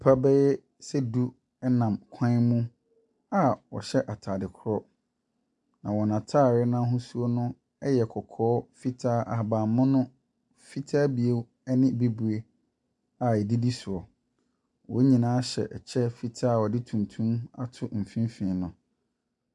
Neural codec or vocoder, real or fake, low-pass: none; real; 10.8 kHz